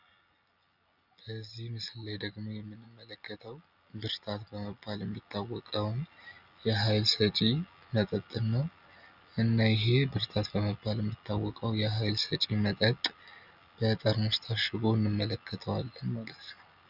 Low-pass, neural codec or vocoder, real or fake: 5.4 kHz; none; real